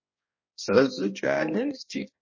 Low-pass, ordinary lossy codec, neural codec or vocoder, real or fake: 7.2 kHz; MP3, 32 kbps; codec, 16 kHz, 1 kbps, X-Codec, HuBERT features, trained on balanced general audio; fake